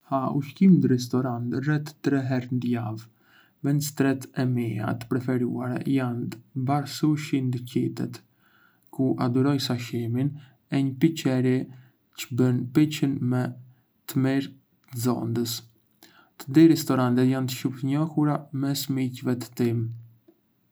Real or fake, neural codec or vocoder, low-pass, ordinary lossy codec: real; none; none; none